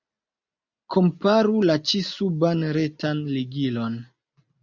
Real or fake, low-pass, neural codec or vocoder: real; 7.2 kHz; none